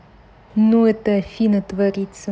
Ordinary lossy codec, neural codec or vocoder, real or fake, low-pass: none; none; real; none